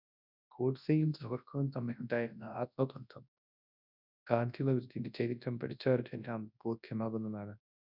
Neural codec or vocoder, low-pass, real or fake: codec, 24 kHz, 0.9 kbps, WavTokenizer, large speech release; 5.4 kHz; fake